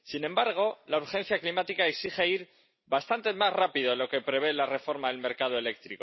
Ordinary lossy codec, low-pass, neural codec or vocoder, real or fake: MP3, 24 kbps; 7.2 kHz; none; real